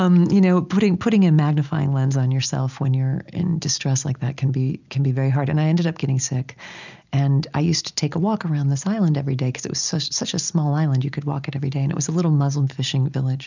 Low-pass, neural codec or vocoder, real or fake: 7.2 kHz; none; real